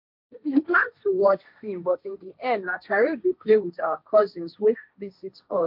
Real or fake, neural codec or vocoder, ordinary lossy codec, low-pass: fake; codec, 16 kHz, 1.1 kbps, Voila-Tokenizer; MP3, 48 kbps; 5.4 kHz